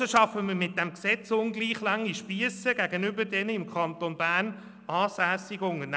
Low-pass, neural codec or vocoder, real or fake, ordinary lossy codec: none; none; real; none